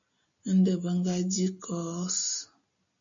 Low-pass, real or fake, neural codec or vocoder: 7.2 kHz; real; none